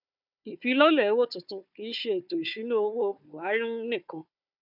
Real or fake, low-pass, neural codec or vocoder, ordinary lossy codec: fake; 5.4 kHz; codec, 16 kHz, 4 kbps, FunCodec, trained on Chinese and English, 50 frames a second; none